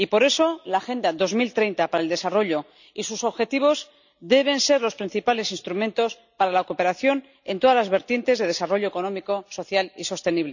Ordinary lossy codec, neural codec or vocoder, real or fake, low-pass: none; none; real; 7.2 kHz